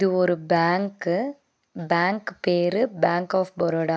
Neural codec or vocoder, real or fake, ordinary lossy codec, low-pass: none; real; none; none